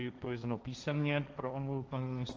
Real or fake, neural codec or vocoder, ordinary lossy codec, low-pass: fake; codec, 16 kHz, 1.1 kbps, Voila-Tokenizer; Opus, 24 kbps; 7.2 kHz